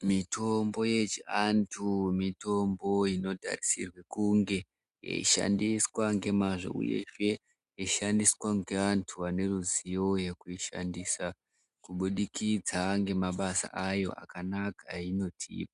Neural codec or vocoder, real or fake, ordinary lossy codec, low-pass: none; real; Opus, 64 kbps; 10.8 kHz